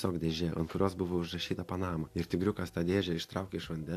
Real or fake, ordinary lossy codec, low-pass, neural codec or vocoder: real; AAC, 64 kbps; 14.4 kHz; none